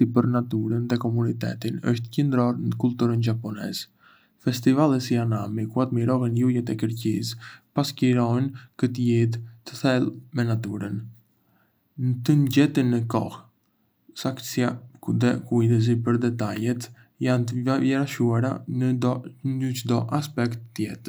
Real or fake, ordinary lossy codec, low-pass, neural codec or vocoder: real; none; none; none